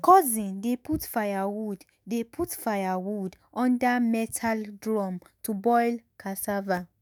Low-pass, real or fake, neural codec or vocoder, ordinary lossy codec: none; fake; autoencoder, 48 kHz, 128 numbers a frame, DAC-VAE, trained on Japanese speech; none